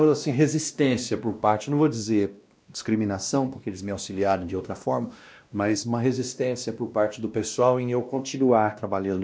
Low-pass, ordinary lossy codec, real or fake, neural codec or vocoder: none; none; fake; codec, 16 kHz, 1 kbps, X-Codec, WavLM features, trained on Multilingual LibriSpeech